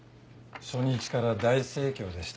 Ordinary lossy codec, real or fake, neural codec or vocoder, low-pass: none; real; none; none